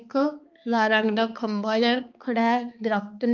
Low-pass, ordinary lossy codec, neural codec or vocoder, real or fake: 7.2 kHz; Opus, 24 kbps; codec, 16 kHz, 2 kbps, X-Codec, HuBERT features, trained on balanced general audio; fake